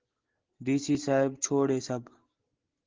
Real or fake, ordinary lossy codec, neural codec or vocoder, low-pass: real; Opus, 16 kbps; none; 7.2 kHz